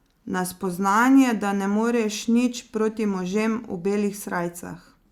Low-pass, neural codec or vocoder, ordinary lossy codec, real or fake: 19.8 kHz; none; Opus, 64 kbps; real